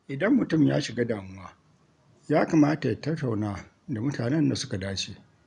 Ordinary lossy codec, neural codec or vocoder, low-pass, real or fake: none; none; 10.8 kHz; real